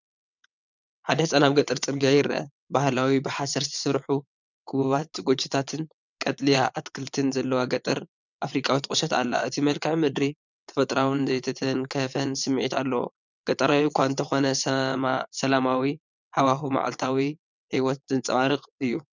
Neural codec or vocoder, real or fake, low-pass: vocoder, 22.05 kHz, 80 mel bands, WaveNeXt; fake; 7.2 kHz